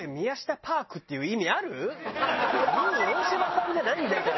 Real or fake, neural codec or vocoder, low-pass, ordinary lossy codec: real; none; 7.2 kHz; MP3, 24 kbps